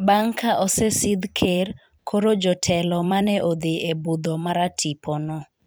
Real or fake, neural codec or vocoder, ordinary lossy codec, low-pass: fake; vocoder, 44.1 kHz, 128 mel bands every 256 samples, BigVGAN v2; none; none